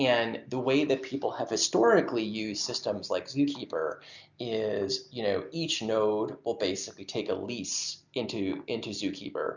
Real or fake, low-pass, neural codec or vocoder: real; 7.2 kHz; none